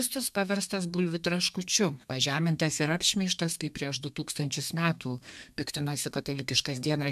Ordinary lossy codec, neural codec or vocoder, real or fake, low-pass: MP3, 96 kbps; codec, 32 kHz, 1.9 kbps, SNAC; fake; 14.4 kHz